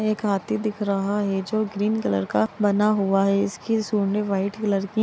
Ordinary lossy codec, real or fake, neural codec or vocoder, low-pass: none; real; none; none